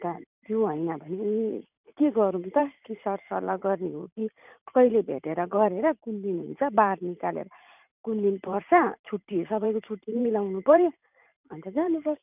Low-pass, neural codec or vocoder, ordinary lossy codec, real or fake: 3.6 kHz; vocoder, 44.1 kHz, 128 mel bands every 512 samples, BigVGAN v2; none; fake